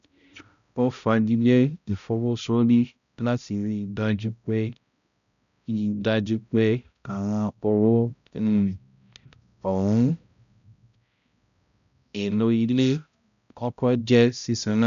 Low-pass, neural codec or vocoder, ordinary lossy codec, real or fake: 7.2 kHz; codec, 16 kHz, 0.5 kbps, X-Codec, HuBERT features, trained on balanced general audio; none; fake